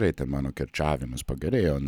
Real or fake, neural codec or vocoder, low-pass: real; none; 19.8 kHz